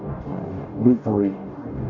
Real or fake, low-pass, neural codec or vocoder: fake; 7.2 kHz; codec, 44.1 kHz, 0.9 kbps, DAC